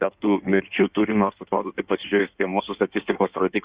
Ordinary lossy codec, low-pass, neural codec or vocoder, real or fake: Opus, 64 kbps; 3.6 kHz; codec, 16 kHz in and 24 kHz out, 1.1 kbps, FireRedTTS-2 codec; fake